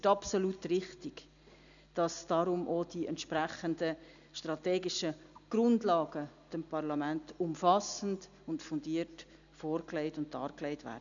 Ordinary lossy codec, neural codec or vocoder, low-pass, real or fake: none; none; 7.2 kHz; real